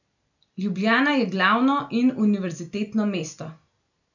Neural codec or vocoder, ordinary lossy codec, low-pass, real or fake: none; none; 7.2 kHz; real